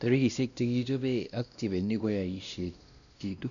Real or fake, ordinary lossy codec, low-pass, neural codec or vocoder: fake; none; 7.2 kHz; codec, 16 kHz, 1 kbps, X-Codec, WavLM features, trained on Multilingual LibriSpeech